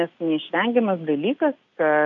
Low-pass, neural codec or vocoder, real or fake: 7.2 kHz; none; real